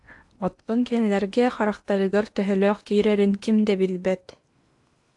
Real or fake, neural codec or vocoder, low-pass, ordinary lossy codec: fake; codec, 16 kHz in and 24 kHz out, 0.8 kbps, FocalCodec, streaming, 65536 codes; 10.8 kHz; AAC, 64 kbps